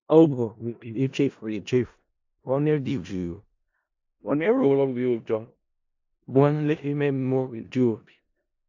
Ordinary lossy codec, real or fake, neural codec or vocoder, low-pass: AAC, 48 kbps; fake; codec, 16 kHz in and 24 kHz out, 0.4 kbps, LongCat-Audio-Codec, four codebook decoder; 7.2 kHz